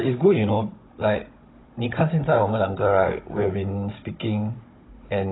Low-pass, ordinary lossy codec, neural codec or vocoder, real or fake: 7.2 kHz; AAC, 16 kbps; codec, 16 kHz, 16 kbps, FunCodec, trained on Chinese and English, 50 frames a second; fake